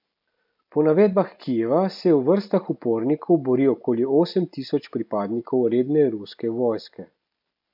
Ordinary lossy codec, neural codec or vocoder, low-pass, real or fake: none; none; 5.4 kHz; real